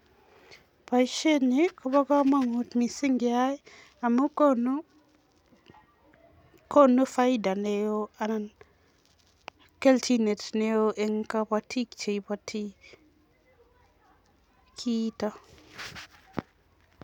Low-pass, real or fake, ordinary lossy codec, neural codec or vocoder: 19.8 kHz; real; none; none